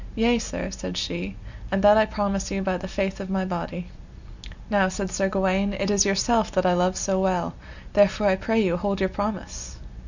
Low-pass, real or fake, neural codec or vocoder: 7.2 kHz; real; none